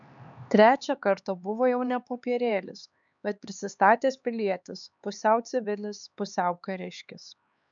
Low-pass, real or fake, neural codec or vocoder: 7.2 kHz; fake; codec, 16 kHz, 4 kbps, X-Codec, HuBERT features, trained on LibriSpeech